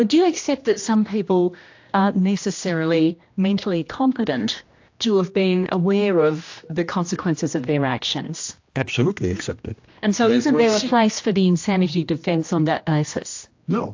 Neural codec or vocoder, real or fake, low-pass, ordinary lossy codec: codec, 16 kHz, 1 kbps, X-Codec, HuBERT features, trained on general audio; fake; 7.2 kHz; AAC, 48 kbps